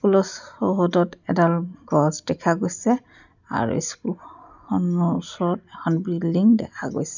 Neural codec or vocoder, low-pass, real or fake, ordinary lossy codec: none; 7.2 kHz; real; none